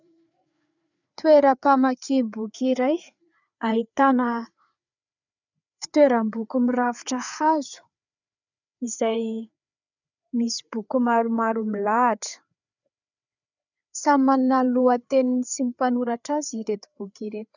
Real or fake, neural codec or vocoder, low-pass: fake; codec, 16 kHz, 4 kbps, FreqCodec, larger model; 7.2 kHz